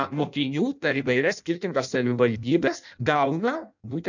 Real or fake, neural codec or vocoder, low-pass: fake; codec, 16 kHz in and 24 kHz out, 0.6 kbps, FireRedTTS-2 codec; 7.2 kHz